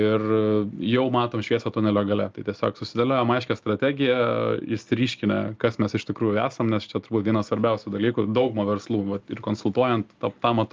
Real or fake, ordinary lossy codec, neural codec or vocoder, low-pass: real; Opus, 24 kbps; none; 7.2 kHz